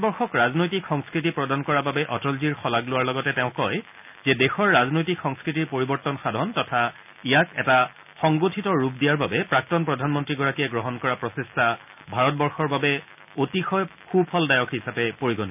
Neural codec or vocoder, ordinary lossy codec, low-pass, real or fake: none; none; 3.6 kHz; real